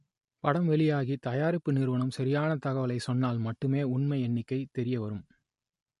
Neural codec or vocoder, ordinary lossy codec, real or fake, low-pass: none; MP3, 48 kbps; real; 14.4 kHz